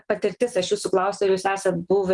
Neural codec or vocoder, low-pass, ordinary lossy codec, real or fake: none; 10.8 kHz; Opus, 24 kbps; real